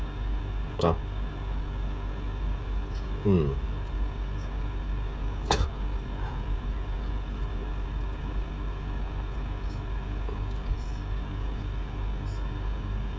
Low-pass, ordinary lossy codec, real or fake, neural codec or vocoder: none; none; fake; codec, 16 kHz, 16 kbps, FreqCodec, smaller model